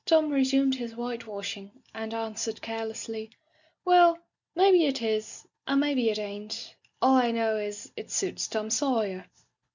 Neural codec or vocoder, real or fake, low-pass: none; real; 7.2 kHz